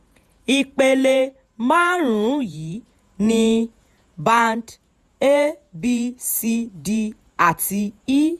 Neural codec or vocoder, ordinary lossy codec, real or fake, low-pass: vocoder, 48 kHz, 128 mel bands, Vocos; none; fake; 14.4 kHz